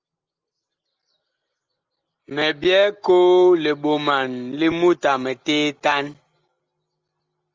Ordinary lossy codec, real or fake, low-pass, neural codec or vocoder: Opus, 32 kbps; real; 7.2 kHz; none